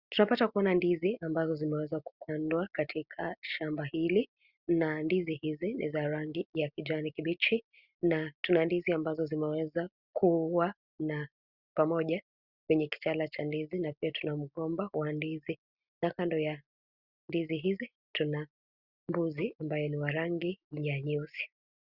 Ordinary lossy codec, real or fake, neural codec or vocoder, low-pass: Opus, 64 kbps; real; none; 5.4 kHz